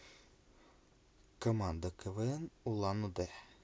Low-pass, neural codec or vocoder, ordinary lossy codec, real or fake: none; none; none; real